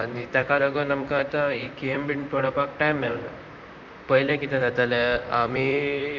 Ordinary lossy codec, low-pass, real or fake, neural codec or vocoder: none; 7.2 kHz; fake; vocoder, 44.1 kHz, 128 mel bands, Pupu-Vocoder